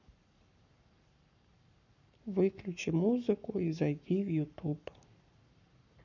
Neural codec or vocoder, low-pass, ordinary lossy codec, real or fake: codec, 44.1 kHz, 7.8 kbps, Pupu-Codec; 7.2 kHz; none; fake